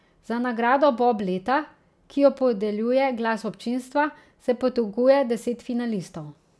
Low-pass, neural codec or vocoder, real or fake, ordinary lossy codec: none; none; real; none